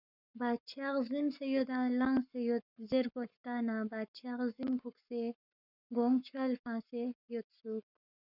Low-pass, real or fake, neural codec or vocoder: 5.4 kHz; fake; codec, 44.1 kHz, 7.8 kbps, Pupu-Codec